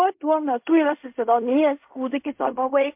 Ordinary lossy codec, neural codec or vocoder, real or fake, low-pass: MP3, 32 kbps; codec, 16 kHz in and 24 kHz out, 0.4 kbps, LongCat-Audio-Codec, fine tuned four codebook decoder; fake; 3.6 kHz